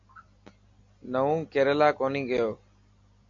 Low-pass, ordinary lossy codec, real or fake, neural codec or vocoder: 7.2 kHz; MP3, 48 kbps; real; none